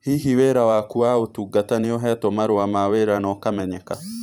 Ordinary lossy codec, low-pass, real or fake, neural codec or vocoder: none; none; fake; vocoder, 44.1 kHz, 128 mel bands every 512 samples, BigVGAN v2